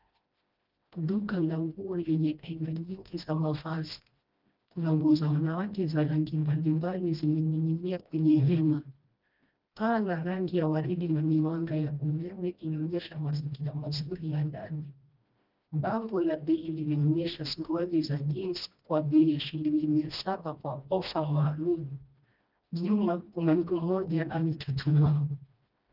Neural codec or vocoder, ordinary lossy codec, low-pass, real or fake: codec, 16 kHz, 1 kbps, FreqCodec, smaller model; Opus, 24 kbps; 5.4 kHz; fake